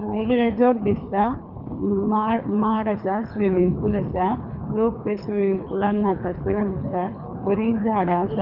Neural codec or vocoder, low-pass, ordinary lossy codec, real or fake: codec, 24 kHz, 3 kbps, HILCodec; 5.4 kHz; none; fake